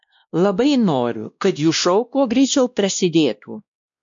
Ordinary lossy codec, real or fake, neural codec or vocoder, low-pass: MP3, 64 kbps; fake; codec, 16 kHz, 1 kbps, X-Codec, WavLM features, trained on Multilingual LibriSpeech; 7.2 kHz